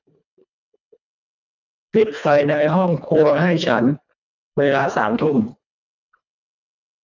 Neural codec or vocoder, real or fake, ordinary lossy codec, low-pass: codec, 24 kHz, 1.5 kbps, HILCodec; fake; none; 7.2 kHz